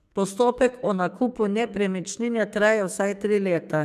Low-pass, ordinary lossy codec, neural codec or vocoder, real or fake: 14.4 kHz; none; codec, 44.1 kHz, 2.6 kbps, SNAC; fake